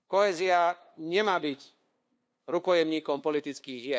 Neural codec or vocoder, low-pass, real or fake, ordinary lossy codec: codec, 16 kHz, 2 kbps, FunCodec, trained on LibriTTS, 25 frames a second; none; fake; none